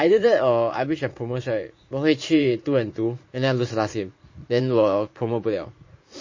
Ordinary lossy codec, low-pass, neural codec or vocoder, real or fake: MP3, 32 kbps; 7.2 kHz; vocoder, 44.1 kHz, 80 mel bands, Vocos; fake